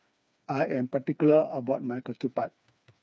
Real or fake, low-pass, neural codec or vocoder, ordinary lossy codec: fake; none; codec, 16 kHz, 4 kbps, FreqCodec, smaller model; none